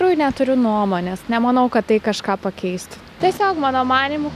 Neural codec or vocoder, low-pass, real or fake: none; 14.4 kHz; real